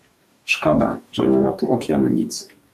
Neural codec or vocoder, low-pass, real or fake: codec, 44.1 kHz, 2.6 kbps, DAC; 14.4 kHz; fake